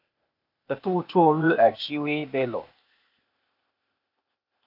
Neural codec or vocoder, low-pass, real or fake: codec, 16 kHz, 0.8 kbps, ZipCodec; 5.4 kHz; fake